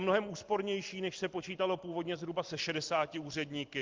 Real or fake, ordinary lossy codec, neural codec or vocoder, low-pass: real; Opus, 32 kbps; none; 7.2 kHz